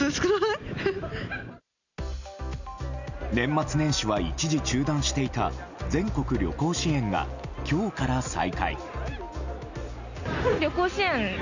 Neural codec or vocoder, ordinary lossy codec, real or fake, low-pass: none; none; real; 7.2 kHz